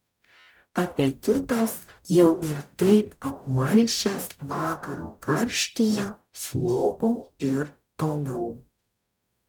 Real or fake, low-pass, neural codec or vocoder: fake; 19.8 kHz; codec, 44.1 kHz, 0.9 kbps, DAC